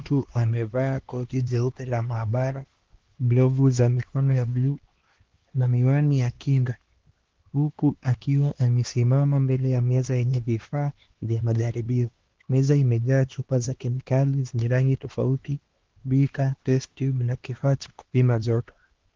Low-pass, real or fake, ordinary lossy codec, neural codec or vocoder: 7.2 kHz; fake; Opus, 16 kbps; codec, 16 kHz, 2 kbps, X-Codec, HuBERT features, trained on LibriSpeech